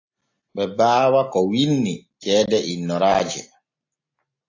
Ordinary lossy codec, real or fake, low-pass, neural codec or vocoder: AAC, 48 kbps; real; 7.2 kHz; none